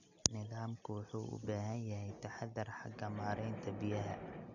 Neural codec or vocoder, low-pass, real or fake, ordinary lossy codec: none; 7.2 kHz; real; none